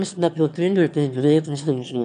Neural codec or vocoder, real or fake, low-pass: autoencoder, 22.05 kHz, a latent of 192 numbers a frame, VITS, trained on one speaker; fake; 9.9 kHz